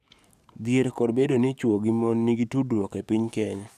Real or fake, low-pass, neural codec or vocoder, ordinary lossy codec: fake; 14.4 kHz; codec, 44.1 kHz, 7.8 kbps, DAC; none